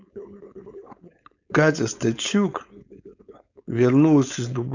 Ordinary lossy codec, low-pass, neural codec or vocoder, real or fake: none; 7.2 kHz; codec, 16 kHz, 4.8 kbps, FACodec; fake